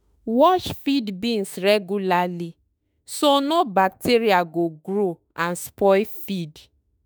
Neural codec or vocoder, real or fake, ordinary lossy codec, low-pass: autoencoder, 48 kHz, 32 numbers a frame, DAC-VAE, trained on Japanese speech; fake; none; none